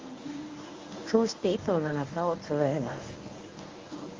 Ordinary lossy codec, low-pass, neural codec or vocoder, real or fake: Opus, 32 kbps; 7.2 kHz; codec, 24 kHz, 0.9 kbps, WavTokenizer, medium speech release version 1; fake